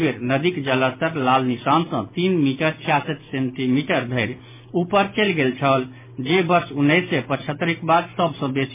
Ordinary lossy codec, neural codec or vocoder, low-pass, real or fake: MP3, 32 kbps; none; 3.6 kHz; real